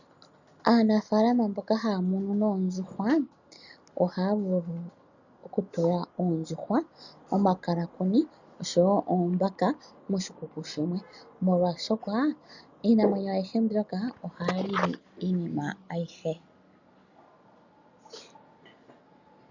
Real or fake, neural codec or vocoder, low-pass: real; none; 7.2 kHz